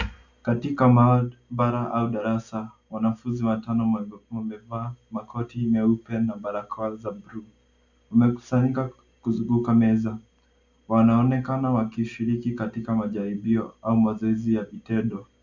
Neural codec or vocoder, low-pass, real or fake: none; 7.2 kHz; real